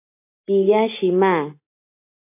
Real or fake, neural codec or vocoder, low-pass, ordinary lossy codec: real; none; 3.6 kHz; MP3, 24 kbps